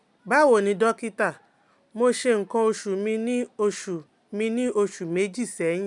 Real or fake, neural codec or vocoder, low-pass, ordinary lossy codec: real; none; 10.8 kHz; none